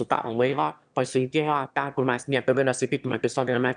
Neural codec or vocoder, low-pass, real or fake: autoencoder, 22.05 kHz, a latent of 192 numbers a frame, VITS, trained on one speaker; 9.9 kHz; fake